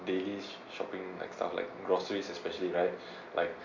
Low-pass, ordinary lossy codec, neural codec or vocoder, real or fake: 7.2 kHz; AAC, 48 kbps; none; real